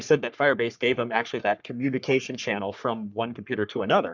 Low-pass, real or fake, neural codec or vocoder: 7.2 kHz; fake; codec, 44.1 kHz, 3.4 kbps, Pupu-Codec